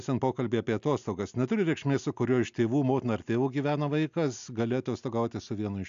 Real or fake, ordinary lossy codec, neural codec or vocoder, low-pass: real; AAC, 96 kbps; none; 7.2 kHz